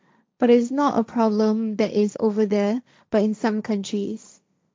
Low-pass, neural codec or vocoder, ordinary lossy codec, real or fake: none; codec, 16 kHz, 1.1 kbps, Voila-Tokenizer; none; fake